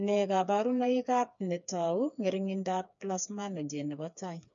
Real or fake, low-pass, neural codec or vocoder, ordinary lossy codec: fake; 7.2 kHz; codec, 16 kHz, 4 kbps, FreqCodec, smaller model; MP3, 96 kbps